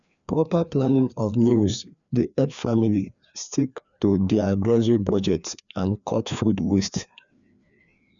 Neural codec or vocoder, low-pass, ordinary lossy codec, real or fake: codec, 16 kHz, 2 kbps, FreqCodec, larger model; 7.2 kHz; none; fake